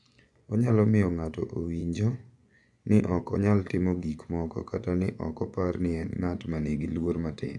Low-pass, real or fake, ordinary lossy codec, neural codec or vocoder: 10.8 kHz; fake; none; vocoder, 24 kHz, 100 mel bands, Vocos